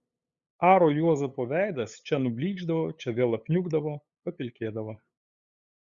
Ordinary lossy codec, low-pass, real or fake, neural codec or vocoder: Opus, 64 kbps; 7.2 kHz; fake; codec, 16 kHz, 8 kbps, FunCodec, trained on LibriTTS, 25 frames a second